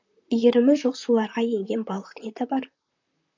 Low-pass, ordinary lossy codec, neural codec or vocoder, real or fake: 7.2 kHz; AAC, 48 kbps; vocoder, 44.1 kHz, 128 mel bands, Pupu-Vocoder; fake